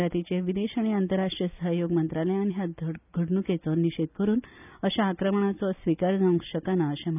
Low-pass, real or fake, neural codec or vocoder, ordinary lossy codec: 3.6 kHz; real; none; none